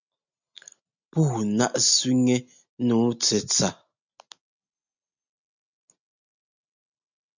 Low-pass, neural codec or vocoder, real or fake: 7.2 kHz; none; real